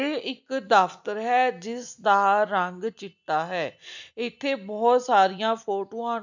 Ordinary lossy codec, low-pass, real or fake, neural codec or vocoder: none; 7.2 kHz; real; none